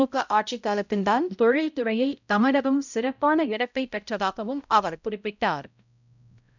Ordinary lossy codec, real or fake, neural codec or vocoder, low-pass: none; fake; codec, 16 kHz, 0.5 kbps, X-Codec, HuBERT features, trained on balanced general audio; 7.2 kHz